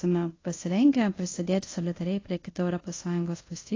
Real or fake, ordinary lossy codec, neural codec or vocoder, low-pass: fake; AAC, 32 kbps; codec, 24 kHz, 0.5 kbps, DualCodec; 7.2 kHz